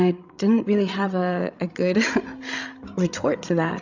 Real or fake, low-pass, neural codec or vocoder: fake; 7.2 kHz; codec, 16 kHz, 16 kbps, FreqCodec, larger model